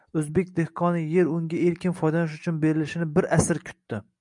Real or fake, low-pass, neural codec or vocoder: real; 10.8 kHz; none